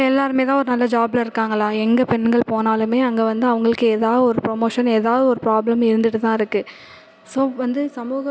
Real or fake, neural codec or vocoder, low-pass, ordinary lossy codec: real; none; none; none